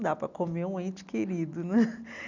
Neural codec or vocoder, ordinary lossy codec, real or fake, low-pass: none; none; real; 7.2 kHz